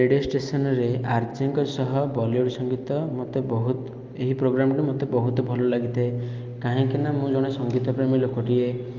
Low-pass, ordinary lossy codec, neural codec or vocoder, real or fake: 7.2 kHz; Opus, 24 kbps; none; real